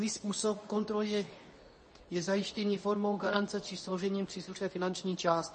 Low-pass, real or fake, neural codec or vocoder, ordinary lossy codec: 10.8 kHz; fake; codec, 24 kHz, 0.9 kbps, WavTokenizer, medium speech release version 2; MP3, 32 kbps